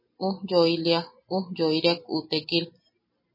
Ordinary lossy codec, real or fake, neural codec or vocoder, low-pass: MP3, 24 kbps; real; none; 5.4 kHz